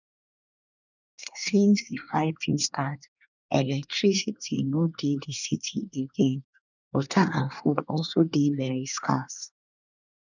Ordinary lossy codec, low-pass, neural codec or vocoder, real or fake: none; 7.2 kHz; codec, 24 kHz, 1 kbps, SNAC; fake